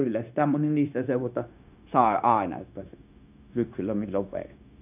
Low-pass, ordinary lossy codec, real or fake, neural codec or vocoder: 3.6 kHz; none; fake; codec, 16 kHz, 0.9 kbps, LongCat-Audio-Codec